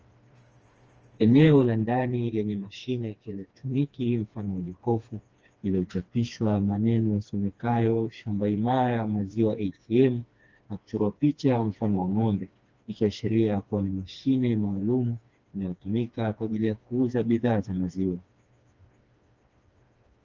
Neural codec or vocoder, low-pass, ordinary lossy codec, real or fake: codec, 16 kHz, 2 kbps, FreqCodec, smaller model; 7.2 kHz; Opus, 24 kbps; fake